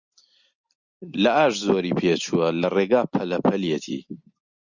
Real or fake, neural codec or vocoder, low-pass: real; none; 7.2 kHz